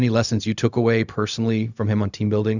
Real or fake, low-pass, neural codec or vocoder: fake; 7.2 kHz; codec, 16 kHz, 0.4 kbps, LongCat-Audio-Codec